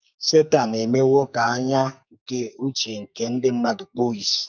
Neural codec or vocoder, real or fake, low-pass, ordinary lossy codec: codec, 44.1 kHz, 2.6 kbps, SNAC; fake; 7.2 kHz; none